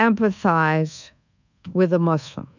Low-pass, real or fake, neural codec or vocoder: 7.2 kHz; fake; codec, 24 kHz, 1.2 kbps, DualCodec